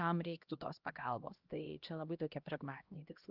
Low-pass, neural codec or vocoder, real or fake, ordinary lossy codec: 5.4 kHz; codec, 16 kHz, 1 kbps, X-Codec, HuBERT features, trained on LibriSpeech; fake; Opus, 24 kbps